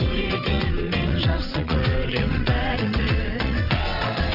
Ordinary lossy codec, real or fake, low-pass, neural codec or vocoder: none; fake; 5.4 kHz; vocoder, 22.05 kHz, 80 mel bands, WaveNeXt